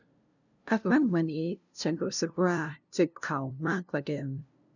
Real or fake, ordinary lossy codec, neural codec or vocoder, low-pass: fake; none; codec, 16 kHz, 0.5 kbps, FunCodec, trained on LibriTTS, 25 frames a second; 7.2 kHz